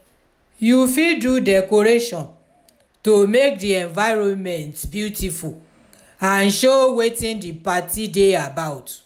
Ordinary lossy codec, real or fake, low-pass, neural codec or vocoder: none; real; 19.8 kHz; none